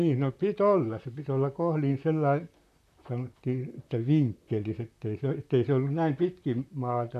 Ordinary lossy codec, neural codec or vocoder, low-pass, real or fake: none; vocoder, 44.1 kHz, 128 mel bands, Pupu-Vocoder; 14.4 kHz; fake